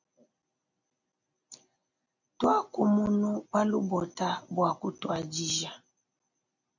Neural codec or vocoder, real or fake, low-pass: none; real; 7.2 kHz